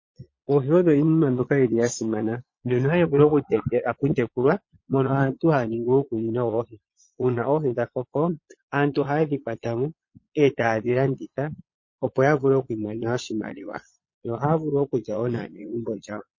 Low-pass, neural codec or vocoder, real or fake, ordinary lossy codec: 7.2 kHz; vocoder, 22.05 kHz, 80 mel bands, Vocos; fake; MP3, 32 kbps